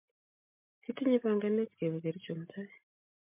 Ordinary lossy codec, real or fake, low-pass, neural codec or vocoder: MP3, 32 kbps; real; 3.6 kHz; none